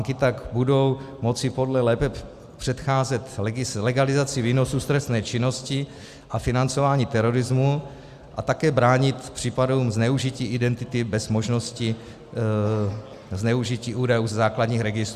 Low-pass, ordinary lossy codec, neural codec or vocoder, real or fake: 14.4 kHz; Opus, 64 kbps; none; real